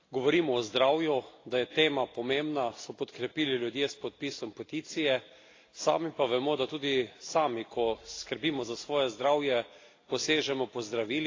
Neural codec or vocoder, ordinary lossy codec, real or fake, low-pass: none; AAC, 32 kbps; real; 7.2 kHz